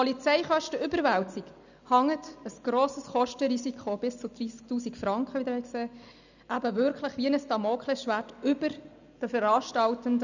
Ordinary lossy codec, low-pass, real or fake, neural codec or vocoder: none; 7.2 kHz; real; none